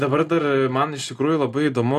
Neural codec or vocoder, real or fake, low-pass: none; real; 14.4 kHz